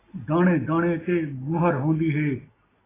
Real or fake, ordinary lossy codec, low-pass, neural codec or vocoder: real; AAC, 16 kbps; 3.6 kHz; none